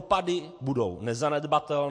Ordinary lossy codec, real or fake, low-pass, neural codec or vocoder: MP3, 48 kbps; real; 9.9 kHz; none